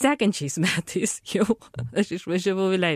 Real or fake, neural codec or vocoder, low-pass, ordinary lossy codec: real; none; 14.4 kHz; MP3, 64 kbps